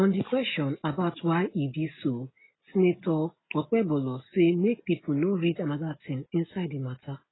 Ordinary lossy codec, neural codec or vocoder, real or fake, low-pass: AAC, 16 kbps; none; real; 7.2 kHz